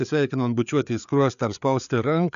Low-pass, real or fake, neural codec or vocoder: 7.2 kHz; fake; codec, 16 kHz, 4 kbps, FreqCodec, larger model